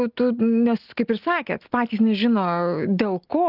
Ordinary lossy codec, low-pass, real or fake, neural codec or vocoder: Opus, 32 kbps; 5.4 kHz; real; none